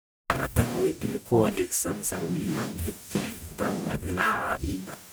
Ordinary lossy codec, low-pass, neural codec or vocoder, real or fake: none; none; codec, 44.1 kHz, 0.9 kbps, DAC; fake